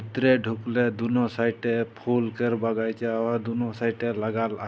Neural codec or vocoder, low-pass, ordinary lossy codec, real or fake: none; none; none; real